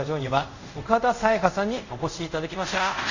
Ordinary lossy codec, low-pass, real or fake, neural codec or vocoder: Opus, 64 kbps; 7.2 kHz; fake; codec, 24 kHz, 0.5 kbps, DualCodec